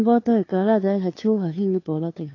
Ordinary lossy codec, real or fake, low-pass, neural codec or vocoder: AAC, 32 kbps; fake; 7.2 kHz; codec, 16 kHz, 2 kbps, FunCodec, trained on LibriTTS, 25 frames a second